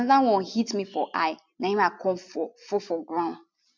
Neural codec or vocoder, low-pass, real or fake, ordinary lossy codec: none; 7.2 kHz; real; MP3, 64 kbps